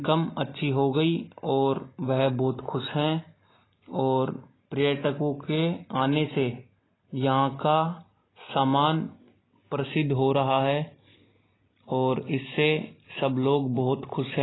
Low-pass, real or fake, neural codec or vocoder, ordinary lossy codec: 7.2 kHz; real; none; AAC, 16 kbps